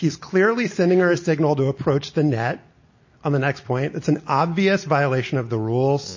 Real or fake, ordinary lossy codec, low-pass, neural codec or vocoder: real; MP3, 32 kbps; 7.2 kHz; none